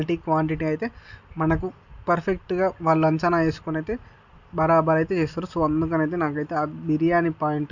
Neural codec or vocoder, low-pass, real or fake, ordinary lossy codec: none; 7.2 kHz; real; none